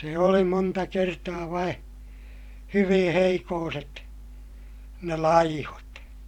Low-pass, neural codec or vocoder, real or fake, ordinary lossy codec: 19.8 kHz; vocoder, 44.1 kHz, 128 mel bands every 512 samples, BigVGAN v2; fake; none